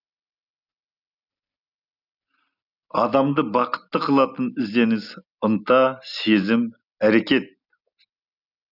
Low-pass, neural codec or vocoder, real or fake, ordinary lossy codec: 5.4 kHz; none; real; none